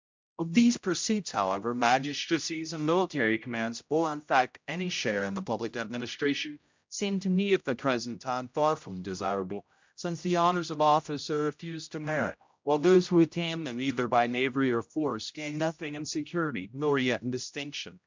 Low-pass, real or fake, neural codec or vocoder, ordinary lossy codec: 7.2 kHz; fake; codec, 16 kHz, 0.5 kbps, X-Codec, HuBERT features, trained on general audio; MP3, 64 kbps